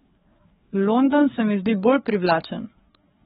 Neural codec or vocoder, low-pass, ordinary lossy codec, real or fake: codec, 16 kHz, 4 kbps, FreqCodec, larger model; 7.2 kHz; AAC, 16 kbps; fake